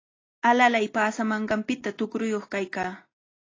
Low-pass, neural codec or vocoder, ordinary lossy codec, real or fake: 7.2 kHz; none; AAC, 32 kbps; real